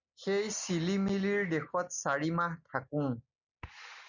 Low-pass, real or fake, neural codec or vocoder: 7.2 kHz; real; none